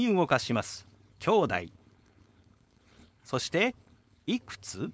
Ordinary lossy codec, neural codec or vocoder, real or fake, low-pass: none; codec, 16 kHz, 4.8 kbps, FACodec; fake; none